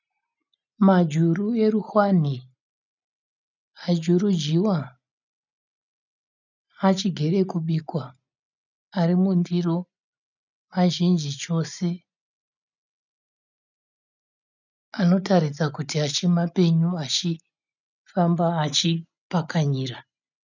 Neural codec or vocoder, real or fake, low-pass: none; real; 7.2 kHz